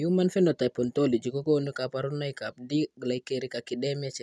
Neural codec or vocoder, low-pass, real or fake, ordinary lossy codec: none; none; real; none